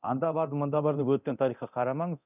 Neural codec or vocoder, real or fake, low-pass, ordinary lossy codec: codec, 24 kHz, 0.9 kbps, DualCodec; fake; 3.6 kHz; none